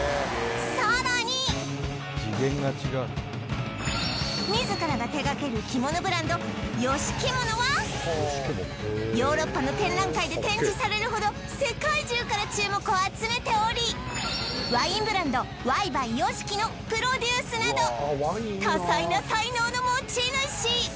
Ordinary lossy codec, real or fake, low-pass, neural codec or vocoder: none; real; none; none